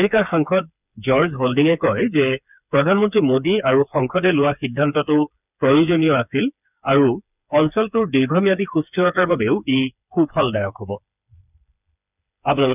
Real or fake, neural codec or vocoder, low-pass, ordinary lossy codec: fake; codec, 16 kHz, 4 kbps, FreqCodec, smaller model; 3.6 kHz; none